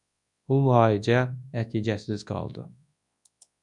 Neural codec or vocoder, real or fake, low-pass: codec, 24 kHz, 0.9 kbps, WavTokenizer, large speech release; fake; 10.8 kHz